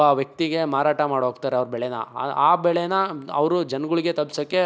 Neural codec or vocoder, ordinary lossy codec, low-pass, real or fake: none; none; none; real